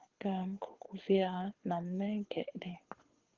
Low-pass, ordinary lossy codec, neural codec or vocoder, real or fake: 7.2 kHz; Opus, 16 kbps; codec, 24 kHz, 6 kbps, HILCodec; fake